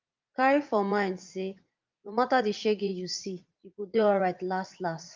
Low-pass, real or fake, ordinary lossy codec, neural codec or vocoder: 7.2 kHz; fake; Opus, 24 kbps; vocoder, 44.1 kHz, 80 mel bands, Vocos